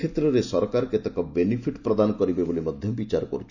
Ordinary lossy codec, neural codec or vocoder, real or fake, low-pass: none; none; real; 7.2 kHz